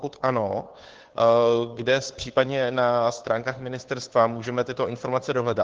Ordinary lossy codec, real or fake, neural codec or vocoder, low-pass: Opus, 16 kbps; fake; codec, 16 kHz, 2 kbps, FunCodec, trained on Chinese and English, 25 frames a second; 7.2 kHz